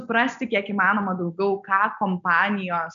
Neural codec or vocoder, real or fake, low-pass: none; real; 7.2 kHz